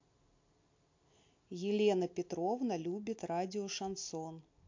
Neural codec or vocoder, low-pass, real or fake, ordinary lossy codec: none; 7.2 kHz; real; MP3, 48 kbps